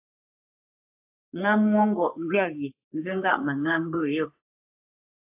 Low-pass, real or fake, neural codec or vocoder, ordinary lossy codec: 3.6 kHz; fake; codec, 44.1 kHz, 3.4 kbps, Pupu-Codec; AAC, 32 kbps